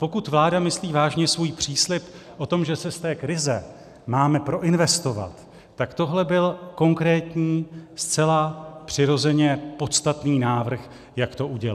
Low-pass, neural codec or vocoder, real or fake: 14.4 kHz; none; real